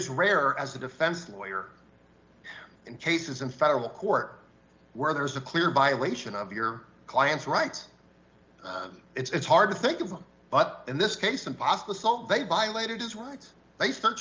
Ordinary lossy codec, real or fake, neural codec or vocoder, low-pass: Opus, 32 kbps; real; none; 7.2 kHz